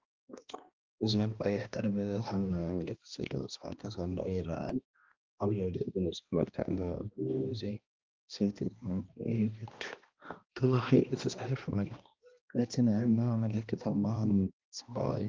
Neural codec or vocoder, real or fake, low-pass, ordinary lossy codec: codec, 16 kHz, 1 kbps, X-Codec, HuBERT features, trained on balanced general audio; fake; 7.2 kHz; Opus, 24 kbps